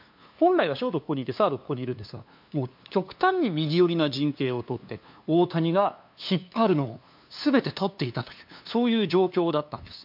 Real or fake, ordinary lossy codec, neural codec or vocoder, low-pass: fake; MP3, 48 kbps; codec, 16 kHz, 2 kbps, FunCodec, trained on LibriTTS, 25 frames a second; 5.4 kHz